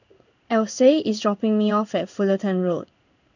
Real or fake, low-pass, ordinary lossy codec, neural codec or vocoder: fake; 7.2 kHz; none; codec, 16 kHz in and 24 kHz out, 1 kbps, XY-Tokenizer